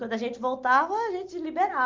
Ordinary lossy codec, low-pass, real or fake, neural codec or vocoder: Opus, 32 kbps; 7.2 kHz; real; none